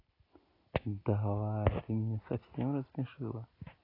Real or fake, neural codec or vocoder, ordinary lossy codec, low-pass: real; none; AAC, 24 kbps; 5.4 kHz